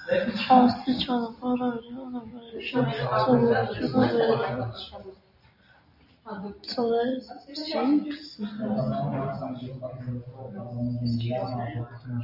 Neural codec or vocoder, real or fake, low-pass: none; real; 5.4 kHz